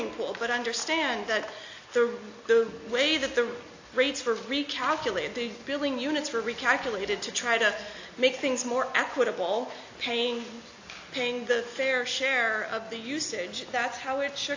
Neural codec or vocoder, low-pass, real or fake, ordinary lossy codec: none; 7.2 kHz; real; AAC, 48 kbps